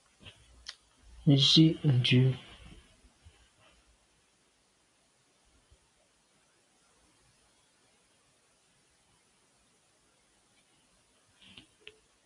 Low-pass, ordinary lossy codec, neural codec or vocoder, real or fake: 10.8 kHz; AAC, 64 kbps; none; real